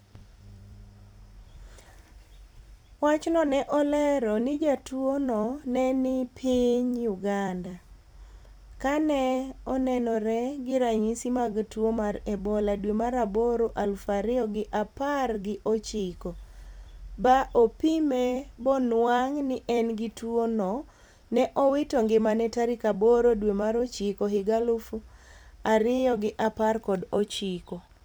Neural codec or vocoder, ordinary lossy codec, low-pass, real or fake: vocoder, 44.1 kHz, 128 mel bands every 256 samples, BigVGAN v2; none; none; fake